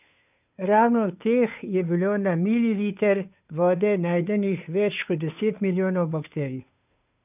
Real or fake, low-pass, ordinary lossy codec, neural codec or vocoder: fake; 3.6 kHz; none; codec, 16 kHz, 2 kbps, FunCodec, trained on Chinese and English, 25 frames a second